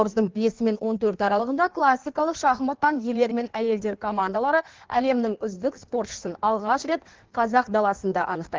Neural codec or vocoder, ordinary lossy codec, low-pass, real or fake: codec, 16 kHz in and 24 kHz out, 1.1 kbps, FireRedTTS-2 codec; Opus, 16 kbps; 7.2 kHz; fake